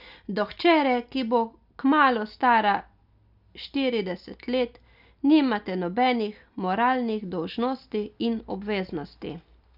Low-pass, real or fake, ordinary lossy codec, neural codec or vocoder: 5.4 kHz; real; none; none